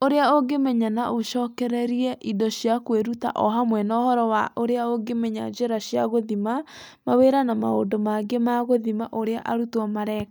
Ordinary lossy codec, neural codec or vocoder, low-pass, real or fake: none; none; none; real